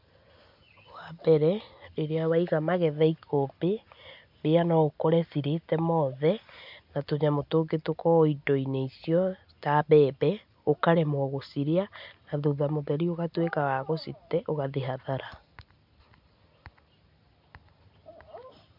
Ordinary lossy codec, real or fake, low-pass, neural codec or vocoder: MP3, 48 kbps; real; 5.4 kHz; none